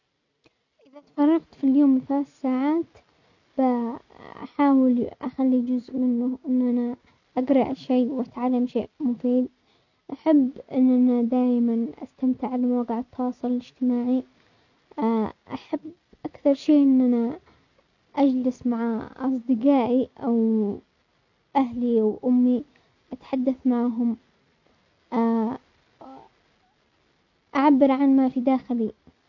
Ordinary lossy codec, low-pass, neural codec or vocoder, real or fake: none; 7.2 kHz; none; real